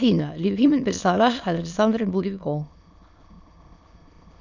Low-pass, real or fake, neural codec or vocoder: 7.2 kHz; fake; autoencoder, 22.05 kHz, a latent of 192 numbers a frame, VITS, trained on many speakers